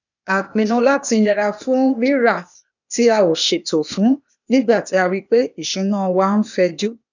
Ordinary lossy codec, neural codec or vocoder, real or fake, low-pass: none; codec, 16 kHz, 0.8 kbps, ZipCodec; fake; 7.2 kHz